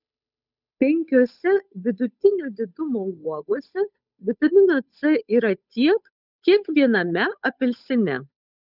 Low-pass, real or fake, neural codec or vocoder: 5.4 kHz; fake; codec, 16 kHz, 8 kbps, FunCodec, trained on Chinese and English, 25 frames a second